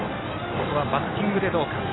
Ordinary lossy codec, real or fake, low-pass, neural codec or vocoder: AAC, 16 kbps; real; 7.2 kHz; none